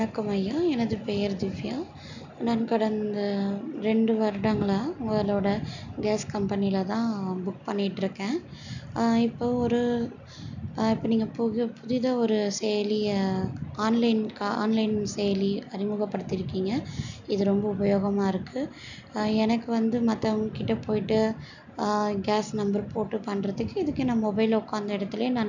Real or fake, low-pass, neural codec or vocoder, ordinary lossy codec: real; 7.2 kHz; none; none